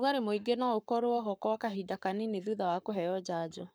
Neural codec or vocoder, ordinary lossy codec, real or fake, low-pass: codec, 44.1 kHz, 7.8 kbps, Pupu-Codec; none; fake; none